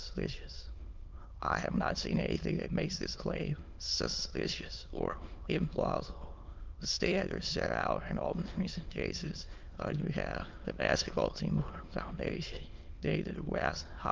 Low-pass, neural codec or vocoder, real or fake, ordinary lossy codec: 7.2 kHz; autoencoder, 22.05 kHz, a latent of 192 numbers a frame, VITS, trained on many speakers; fake; Opus, 24 kbps